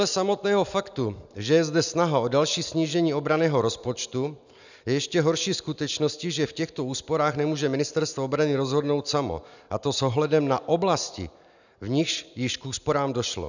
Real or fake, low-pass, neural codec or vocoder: real; 7.2 kHz; none